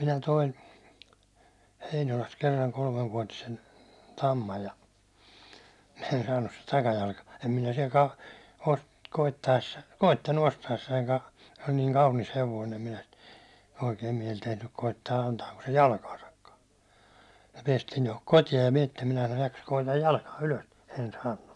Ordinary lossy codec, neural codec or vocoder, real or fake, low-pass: none; none; real; none